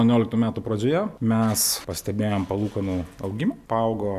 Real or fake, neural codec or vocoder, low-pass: real; none; 14.4 kHz